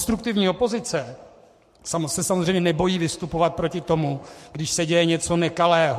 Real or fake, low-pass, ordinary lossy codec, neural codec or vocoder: fake; 14.4 kHz; MP3, 64 kbps; codec, 44.1 kHz, 7.8 kbps, Pupu-Codec